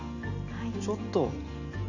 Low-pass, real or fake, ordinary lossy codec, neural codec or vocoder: 7.2 kHz; real; none; none